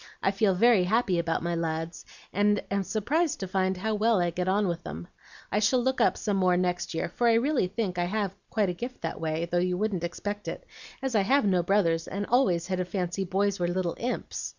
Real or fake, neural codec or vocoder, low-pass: real; none; 7.2 kHz